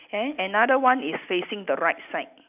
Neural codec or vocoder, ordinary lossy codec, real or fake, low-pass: none; none; real; 3.6 kHz